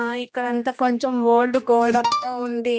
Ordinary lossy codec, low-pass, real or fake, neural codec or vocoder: none; none; fake; codec, 16 kHz, 1 kbps, X-Codec, HuBERT features, trained on general audio